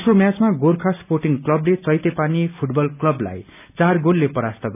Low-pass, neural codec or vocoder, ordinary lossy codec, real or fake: 3.6 kHz; none; none; real